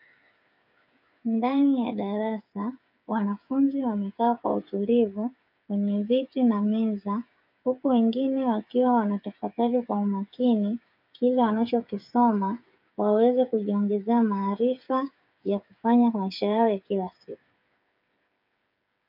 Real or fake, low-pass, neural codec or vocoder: fake; 5.4 kHz; codec, 16 kHz, 8 kbps, FreqCodec, smaller model